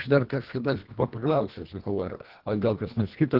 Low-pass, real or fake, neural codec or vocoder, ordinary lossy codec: 5.4 kHz; fake; codec, 24 kHz, 1.5 kbps, HILCodec; Opus, 16 kbps